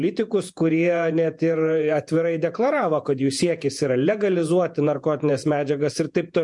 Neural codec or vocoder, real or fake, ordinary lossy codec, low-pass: vocoder, 48 kHz, 128 mel bands, Vocos; fake; MP3, 64 kbps; 10.8 kHz